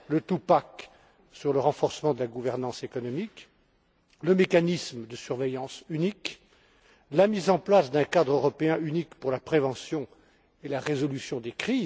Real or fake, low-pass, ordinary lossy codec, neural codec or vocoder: real; none; none; none